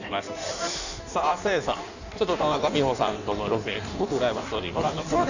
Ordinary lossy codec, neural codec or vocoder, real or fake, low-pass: none; codec, 16 kHz in and 24 kHz out, 1.1 kbps, FireRedTTS-2 codec; fake; 7.2 kHz